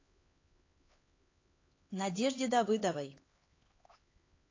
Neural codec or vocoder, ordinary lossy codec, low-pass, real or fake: codec, 16 kHz, 4 kbps, X-Codec, HuBERT features, trained on LibriSpeech; AAC, 32 kbps; 7.2 kHz; fake